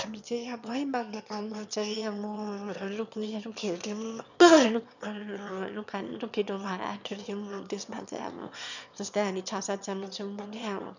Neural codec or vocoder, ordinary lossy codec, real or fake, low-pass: autoencoder, 22.05 kHz, a latent of 192 numbers a frame, VITS, trained on one speaker; none; fake; 7.2 kHz